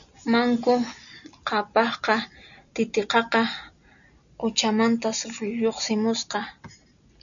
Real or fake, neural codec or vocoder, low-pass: real; none; 7.2 kHz